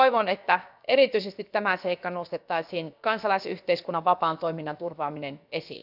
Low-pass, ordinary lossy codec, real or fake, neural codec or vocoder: 5.4 kHz; none; fake; codec, 16 kHz, about 1 kbps, DyCAST, with the encoder's durations